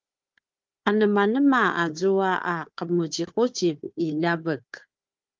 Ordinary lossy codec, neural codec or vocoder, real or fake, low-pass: Opus, 32 kbps; codec, 16 kHz, 4 kbps, FunCodec, trained on Chinese and English, 50 frames a second; fake; 7.2 kHz